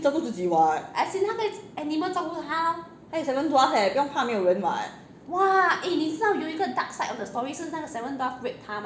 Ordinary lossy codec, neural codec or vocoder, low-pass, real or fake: none; none; none; real